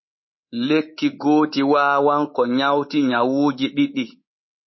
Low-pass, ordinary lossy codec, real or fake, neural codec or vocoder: 7.2 kHz; MP3, 24 kbps; fake; codec, 24 kHz, 3.1 kbps, DualCodec